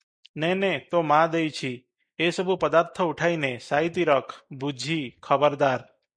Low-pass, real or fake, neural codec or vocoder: 9.9 kHz; real; none